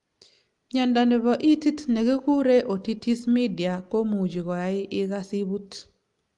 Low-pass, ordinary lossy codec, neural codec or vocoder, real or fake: 10.8 kHz; Opus, 32 kbps; none; real